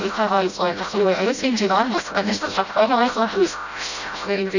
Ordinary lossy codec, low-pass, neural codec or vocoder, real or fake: none; 7.2 kHz; codec, 16 kHz, 0.5 kbps, FreqCodec, smaller model; fake